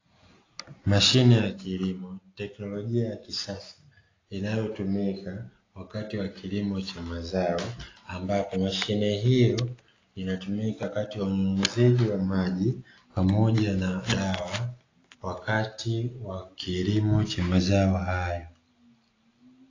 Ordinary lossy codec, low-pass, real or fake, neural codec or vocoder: AAC, 32 kbps; 7.2 kHz; real; none